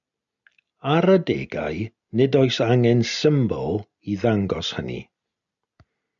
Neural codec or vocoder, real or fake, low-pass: none; real; 7.2 kHz